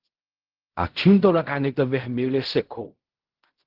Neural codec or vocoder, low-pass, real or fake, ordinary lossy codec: codec, 16 kHz in and 24 kHz out, 0.4 kbps, LongCat-Audio-Codec, fine tuned four codebook decoder; 5.4 kHz; fake; Opus, 16 kbps